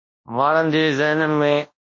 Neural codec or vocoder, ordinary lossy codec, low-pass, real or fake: codec, 24 kHz, 0.9 kbps, WavTokenizer, large speech release; MP3, 32 kbps; 7.2 kHz; fake